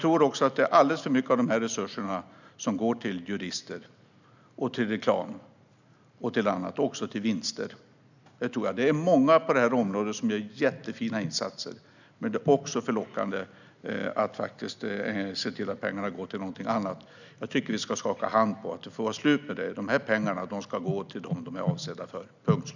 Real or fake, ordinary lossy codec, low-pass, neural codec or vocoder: real; none; 7.2 kHz; none